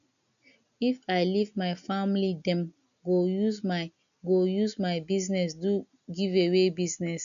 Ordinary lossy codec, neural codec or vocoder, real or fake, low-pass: none; none; real; 7.2 kHz